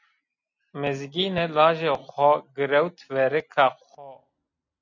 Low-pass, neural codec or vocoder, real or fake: 7.2 kHz; none; real